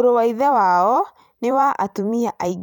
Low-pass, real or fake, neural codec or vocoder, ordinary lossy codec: 19.8 kHz; fake; vocoder, 44.1 kHz, 128 mel bands every 512 samples, BigVGAN v2; none